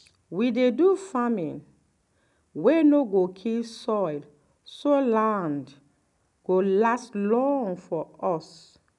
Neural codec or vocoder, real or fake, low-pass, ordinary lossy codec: none; real; 10.8 kHz; none